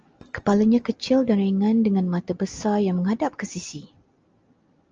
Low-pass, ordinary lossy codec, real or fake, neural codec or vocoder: 7.2 kHz; Opus, 24 kbps; real; none